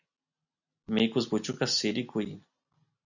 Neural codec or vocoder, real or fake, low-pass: none; real; 7.2 kHz